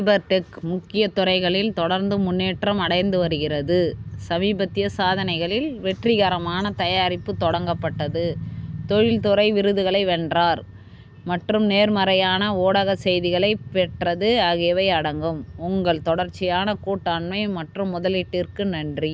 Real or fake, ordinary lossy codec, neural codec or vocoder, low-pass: real; none; none; none